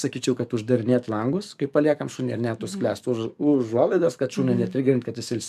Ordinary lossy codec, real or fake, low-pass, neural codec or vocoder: MP3, 96 kbps; fake; 14.4 kHz; codec, 44.1 kHz, 7.8 kbps, DAC